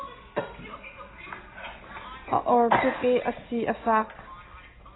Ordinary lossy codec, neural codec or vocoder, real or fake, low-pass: AAC, 16 kbps; none; real; 7.2 kHz